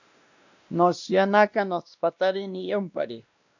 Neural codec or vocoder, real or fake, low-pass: codec, 16 kHz, 1 kbps, X-Codec, WavLM features, trained on Multilingual LibriSpeech; fake; 7.2 kHz